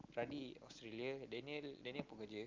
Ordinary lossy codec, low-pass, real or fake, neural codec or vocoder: Opus, 24 kbps; 7.2 kHz; real; none